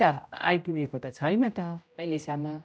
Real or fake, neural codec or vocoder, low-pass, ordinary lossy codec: fake; codec, 16 kHz, 0.5 kbps, X-Codec, HuBERT features, trained on general audio; none; none